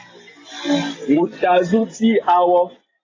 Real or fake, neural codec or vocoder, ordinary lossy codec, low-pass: real; none; AAC, 32 kbps; 7.2 kHz